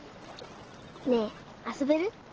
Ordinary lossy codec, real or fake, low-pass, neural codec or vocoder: Opus, 16 kbps; real; 7.2 kHz; none